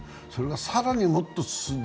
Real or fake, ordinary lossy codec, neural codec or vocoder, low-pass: real; none; none; none